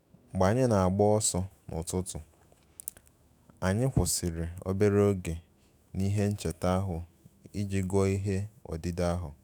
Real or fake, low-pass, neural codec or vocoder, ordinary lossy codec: fake; none; autoencoder, 48 kHz, 128 numbers a frame, DAC-VAE, trained on Japanese speech; none